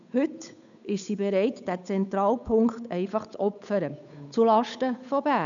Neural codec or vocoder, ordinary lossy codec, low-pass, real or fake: codec, 16 kHz, 8 kbps, FunCodec, trained on Chinese and English, 25 frames a second; none; 7.2 kHz; fake